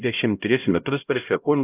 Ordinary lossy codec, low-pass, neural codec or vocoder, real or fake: AAC, 32 kbps; 3.6 kHz; codec, 16 kHz, 0.5 kbps, X-Codec, HuBERT features, trained on LibriSpeech; fake